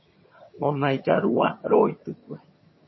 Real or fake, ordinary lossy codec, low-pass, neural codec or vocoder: fake; MP3, 24 kbps; 7.2 kHz; vocoder, 22.05 kHz, 80 mel bands, HiFi-GAN